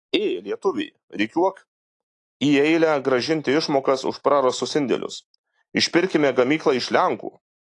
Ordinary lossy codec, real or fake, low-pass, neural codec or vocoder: AAC, 48 kbps; real; 10.8 kHz; none